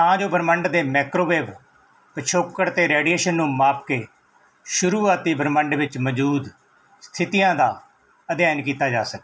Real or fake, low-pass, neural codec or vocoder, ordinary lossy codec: real; none; none; none